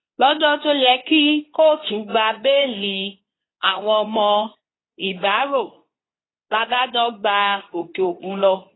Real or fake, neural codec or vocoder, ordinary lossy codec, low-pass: fake; codec, 24 kHz, 0.9 kbps, WavTokenizer, medium speech release version 2; AAC, 16 kbps; 7.2 kHz